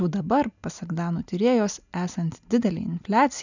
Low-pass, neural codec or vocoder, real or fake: 7.2 kHz; none; real